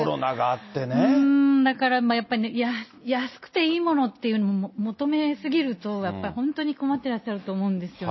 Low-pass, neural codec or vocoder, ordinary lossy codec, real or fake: 7.2 kHz; none; MP3, 24 kbps; real